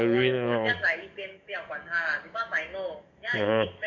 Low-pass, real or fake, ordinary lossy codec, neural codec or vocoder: 7.2 kHz; fake; none; codec, 44.1 kHz, 7.8 kbps, DAC